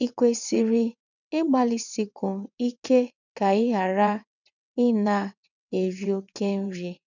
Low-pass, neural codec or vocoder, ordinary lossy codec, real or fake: 7.2 kHz; vocoder, 22.05 kHz, 80 mel bands, WaveNeXt; none; fake